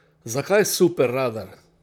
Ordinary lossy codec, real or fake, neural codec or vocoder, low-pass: none; fake; vocoder, 44.1 kHz, 128 mel bands, Pupu-Vocoder; none